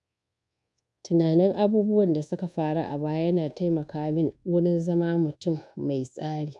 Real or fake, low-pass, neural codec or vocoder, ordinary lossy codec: fake; 10.8 kHz; codec, 24 kHz, 1.2 kbps, DualCodec; none